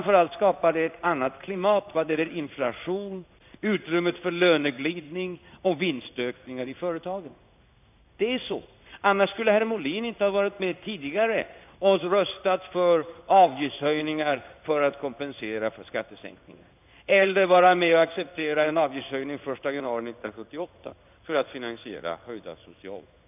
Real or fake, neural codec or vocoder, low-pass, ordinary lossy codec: fake; codec, 16 kHz in and 24 kHz out, 1 kbps, XY-Tokenizer; 3.6 kHz; none